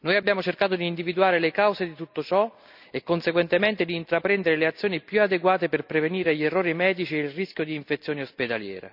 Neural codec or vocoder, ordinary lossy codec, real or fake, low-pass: none; none; real; 5.4 kHz